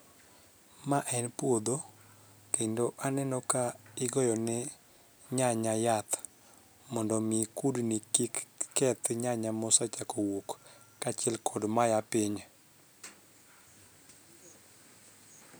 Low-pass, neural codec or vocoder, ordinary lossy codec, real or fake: none; none; none; real